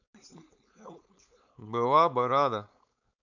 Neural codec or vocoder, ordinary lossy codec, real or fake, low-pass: codec, 16 kHz, 4.8 kbps, FACodec; none; fake; 7.2 kHz